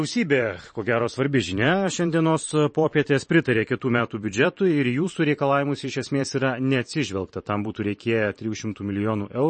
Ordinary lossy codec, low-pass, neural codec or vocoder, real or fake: MP3, 32 kbps; 10.8 kHz; vocoder, 44.1 kHz, 128 mel bands every 512 samples, BigVGAN v2; fake